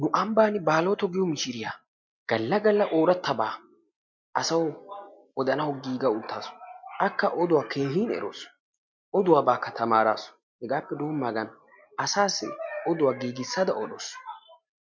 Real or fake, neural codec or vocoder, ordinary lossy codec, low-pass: real; none; MP3, 64 kbps; 7.2 kHz